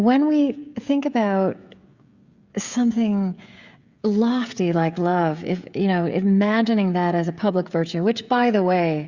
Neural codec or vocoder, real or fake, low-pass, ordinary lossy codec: codec, 16 kHz, 16 kbps, FreqCodec, smaller model; fake; 7.2 kHz; Opus, 64 kbps